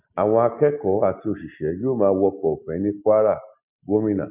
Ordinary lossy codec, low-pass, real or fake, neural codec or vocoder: none; 3.6 kHz; real; none